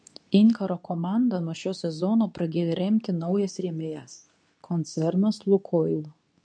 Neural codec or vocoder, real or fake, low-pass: codec, 24 kHz, 0.9 kbps, WavTokenizer, medium speech release version 2; fake; 9.9 kHz